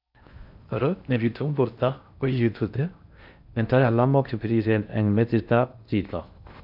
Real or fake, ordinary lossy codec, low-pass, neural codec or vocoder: fake; none; 5.4 kHz; codec, 16 kHz in and 24 kHz out, 0.6 kbps, FocalCodec, streaming, 4096 codes